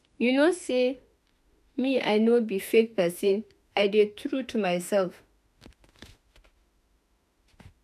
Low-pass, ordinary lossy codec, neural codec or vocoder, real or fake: 14.4 kHz; none; autoencoder, 48 kHz, 32 numbers a frame, DAC-VAE, trained on Japanese speech; fake